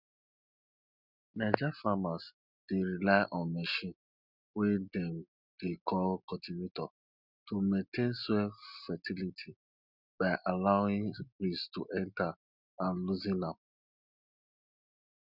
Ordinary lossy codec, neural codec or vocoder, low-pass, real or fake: none; none; 5.4 kHz; real